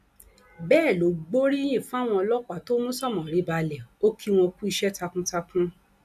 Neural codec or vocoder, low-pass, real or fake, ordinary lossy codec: none; 14.4 kHz; real; AAC, 96 kbps